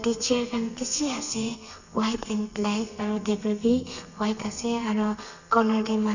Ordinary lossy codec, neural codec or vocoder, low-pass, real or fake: none; codec, 32 kHz, 1.9 kbps, SNAC; 7.2 kHz; fake